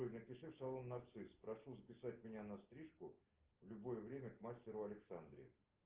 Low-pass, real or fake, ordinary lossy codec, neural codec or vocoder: 3.6 kHz; real; Opus, 16 kbps; none